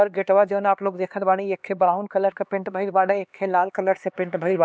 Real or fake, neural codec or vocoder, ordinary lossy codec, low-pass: fake; codec, 16 kHz, 2 kbps, X-Codec, HuBERT features, trained on LibriSpeech; none; none